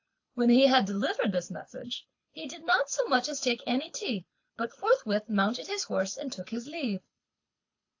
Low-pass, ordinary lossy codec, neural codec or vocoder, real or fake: 7.2 kHz; AAC, 48 kbps; codec, 24 kHz, 6 kbps, HILCodec; fake